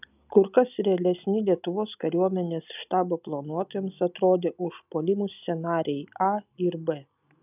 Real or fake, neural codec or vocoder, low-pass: real; none; 3.6 kHz